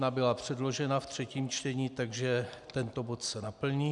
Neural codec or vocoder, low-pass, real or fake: none; 10.8 kHz; real